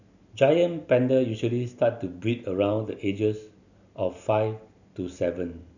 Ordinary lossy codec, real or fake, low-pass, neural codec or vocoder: none; real; 7.2 kHz; none